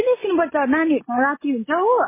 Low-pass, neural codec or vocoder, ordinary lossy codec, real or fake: 3.6 kHz; none; MP3, 16 kbps; real